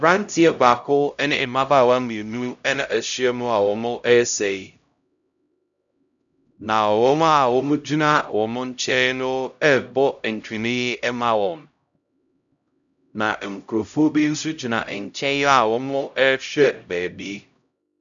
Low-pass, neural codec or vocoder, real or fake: 7.2 kHz; codec, 16 kHz, 0.5 kbps, X-Codec, HuBERT features, trained on LibriSpeech; fake